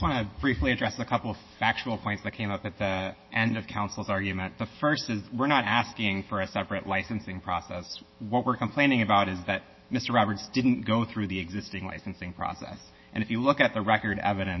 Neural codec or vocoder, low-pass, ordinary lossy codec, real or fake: autoencoder, 48 kHz, 128 numbers a frame, DAC-VAE, trained on Japanese speech; 7.2 kHz; MP3, 24 kbps; fake